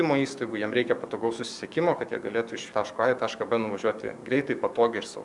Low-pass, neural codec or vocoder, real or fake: 10.8 kHz; codec, 44.1 kHz, 7.8 kbps, DAC; fake